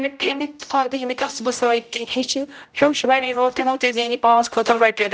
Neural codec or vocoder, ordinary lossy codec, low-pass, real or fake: codec, 16 kHz, 0.5 kbps, X-Codec, HuBERT features, trained on general audio; none; none; fake